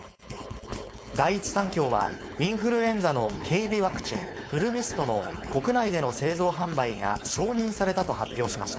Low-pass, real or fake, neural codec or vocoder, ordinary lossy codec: none; fake; codec, 16 kHz, 4.8 kbps, FACodec; none